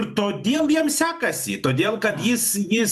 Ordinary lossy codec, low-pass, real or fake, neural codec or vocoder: MP3, 96 kbps; 14.4 kHz; fake; vocoder, 44.1 kHz, 128 mel bands every 512 samples, BigVGAN v2